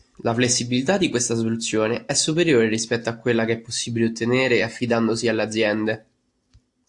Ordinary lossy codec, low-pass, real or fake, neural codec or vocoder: AAC, 64 kbps; 10.8 kHz; real; none